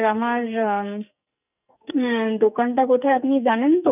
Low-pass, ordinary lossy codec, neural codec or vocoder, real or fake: 3.6 kHz; none; codec, 44.1 kHz, 2.6 kbps, SNAC; fake